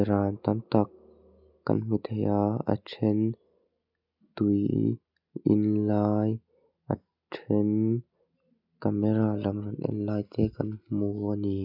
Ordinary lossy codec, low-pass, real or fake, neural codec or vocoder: none; 5.4 kHz; real; none